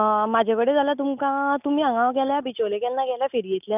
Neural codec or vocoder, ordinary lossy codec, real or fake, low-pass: none; none; real; 3.6 kHz